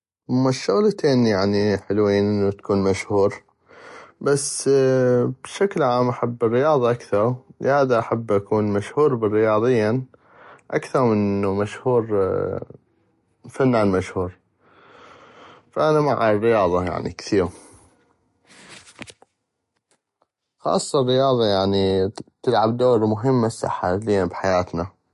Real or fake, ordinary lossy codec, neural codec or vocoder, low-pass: real; MP3, 48 kbps; none; 14.4 kHz